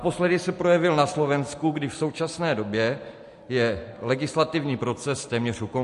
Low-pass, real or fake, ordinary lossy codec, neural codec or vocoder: 14.4 kHz; real; MP3, 48 kbps; none